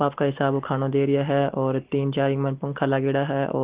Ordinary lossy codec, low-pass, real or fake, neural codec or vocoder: Opus, 16 kbps; 3.6 kHz; real; none